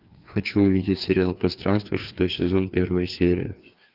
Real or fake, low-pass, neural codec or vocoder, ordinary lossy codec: fake; 5.4 kHz; codec, 16 kHz, 2 kbps, FreqCodec, larger model; Opus, 24 kbps